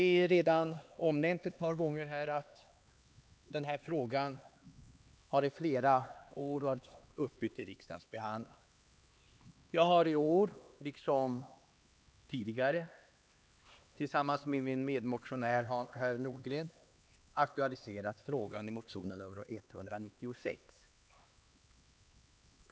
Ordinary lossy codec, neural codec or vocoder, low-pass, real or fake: none; codec, 16 kHz, 2 kbps, X-Codec, HuBERT features, trained on LibriSpeech; none; fake